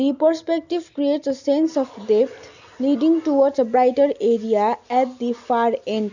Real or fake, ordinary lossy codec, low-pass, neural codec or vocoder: real; none; 7.2 kHz; none